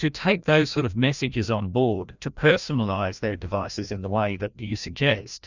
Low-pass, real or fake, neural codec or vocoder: 7.2 kHz; fake; codec, 16 kHz, 1 kbps, FreqCodec, larger model